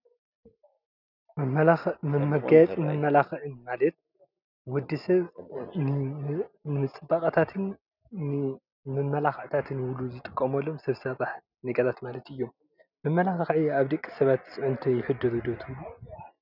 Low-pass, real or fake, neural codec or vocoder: 5.4 kHz; real; none